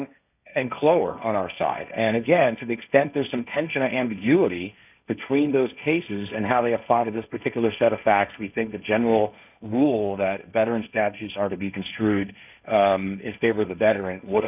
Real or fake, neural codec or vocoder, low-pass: fake; codec, 16 kHz, 1.1 kbps, Voila-Tokenizer; 3.6 kHz